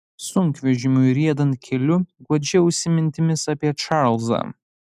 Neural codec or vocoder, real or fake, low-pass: none; real; 14.4 kHz